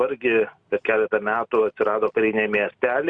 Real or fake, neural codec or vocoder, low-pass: real; none; 9.9 kHz